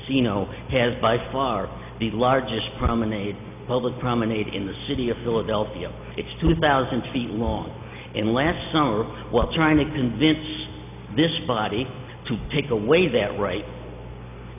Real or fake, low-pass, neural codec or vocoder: real; 3.6 kHz; none